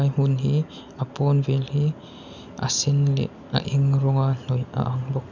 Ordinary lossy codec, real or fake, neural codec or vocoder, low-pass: none; real; none; 7.2 kHz